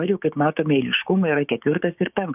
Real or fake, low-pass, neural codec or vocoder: fake; 3.6 kHz; codec, 16 kHz, 6 kbps, DAC